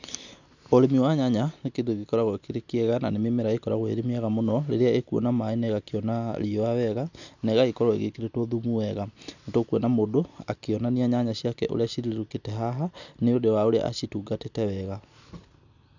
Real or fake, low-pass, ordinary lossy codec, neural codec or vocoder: real; 7.2 kHz; none; none